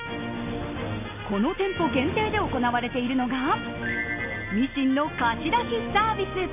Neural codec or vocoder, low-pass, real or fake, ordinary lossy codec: none; 3.6 kHz; real; none